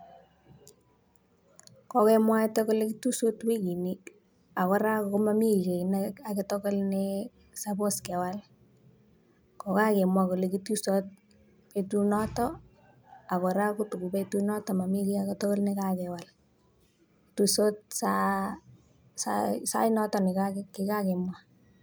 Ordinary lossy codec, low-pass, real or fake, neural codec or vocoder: none; none; real; none